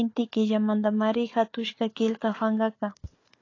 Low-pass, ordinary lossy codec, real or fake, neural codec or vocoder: 7.2 kHz; AAC, 32 kbps; fake; codec, 16 kHz, 4 kbps, FunCodec, trained on Chinese and English, 50 frames a second